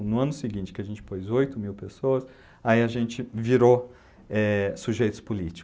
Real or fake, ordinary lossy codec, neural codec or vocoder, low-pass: real; none; none; none